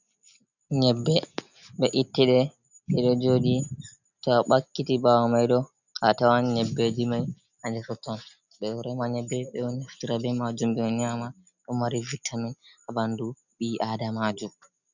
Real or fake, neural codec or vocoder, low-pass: real; none; 7.2 kHz